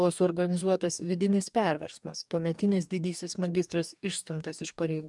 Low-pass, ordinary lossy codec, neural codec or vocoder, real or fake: 10.8 kHz; MP3, 96 kbps; codec, 44.1 kHz, 2.6 kbps, DAC; fake